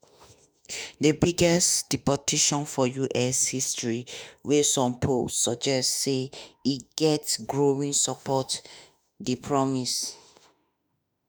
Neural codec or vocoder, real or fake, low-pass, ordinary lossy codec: autoencoder, 48 kHz, 32 numbers a frame, DAC-VAE, trained on Japanese speech; fake; none; none